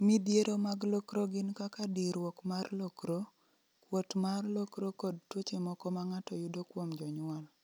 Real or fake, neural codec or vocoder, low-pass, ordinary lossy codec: real; none; none; none